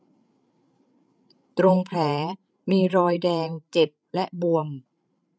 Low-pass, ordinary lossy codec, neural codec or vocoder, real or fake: none; none; codec, 16 kHz, 8 kbps, FreqCodec, larger model; fake